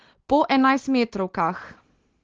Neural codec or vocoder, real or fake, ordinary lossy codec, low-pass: none; real; Opus, 16 kbps; 7.2 kHz